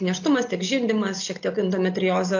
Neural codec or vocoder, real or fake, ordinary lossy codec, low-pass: none; real; AAC, 48 kbps; 7.2 kHz